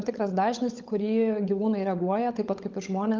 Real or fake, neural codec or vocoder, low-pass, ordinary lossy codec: fake; codec, 16 kHz, 16 kbps, FunCodec, trained on LibriTTS, 50 frames a second; 7.2 kHz; Opus, 32 kbps